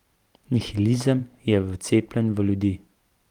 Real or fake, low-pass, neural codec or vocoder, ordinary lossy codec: fake; 19.8 kHz; vocoder, 44.1 kHz, 128 mel bands every 512 samples, BigVGAN v2; Opus, 24 kbps